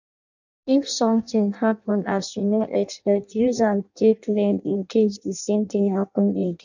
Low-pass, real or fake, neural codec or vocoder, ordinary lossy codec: 7.2 kHz; fake; codec, 16 kHz in and 24 kHz out, 0.6 kbps, FireRedTTS-2 codec; none